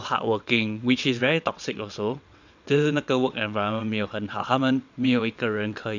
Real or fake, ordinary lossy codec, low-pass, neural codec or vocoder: fake; none; 7.2 kHz; vocoder, 22.05 kHz, 80 mel bands, WaveNeXt